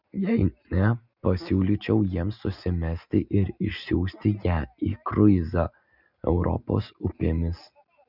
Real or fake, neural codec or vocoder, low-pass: real; none; 5.4 kHz